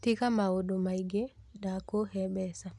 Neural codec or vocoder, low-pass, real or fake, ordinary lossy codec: none; none; real; none